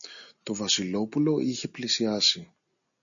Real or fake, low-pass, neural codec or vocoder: real; 7.2 kHz; none